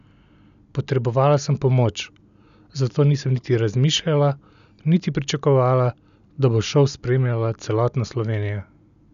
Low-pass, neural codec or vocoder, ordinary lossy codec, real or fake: 7.2 kHz; none; none; real